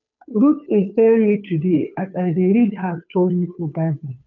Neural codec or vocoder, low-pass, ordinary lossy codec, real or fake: codec, 16 kHz, 2 kbps, FunCodec, trained on Chinese and English, 25 frames a second; 7.2 kHz; none; fake